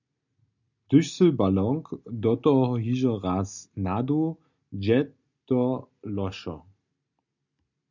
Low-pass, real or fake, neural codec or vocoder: 7.2 kHz; real; none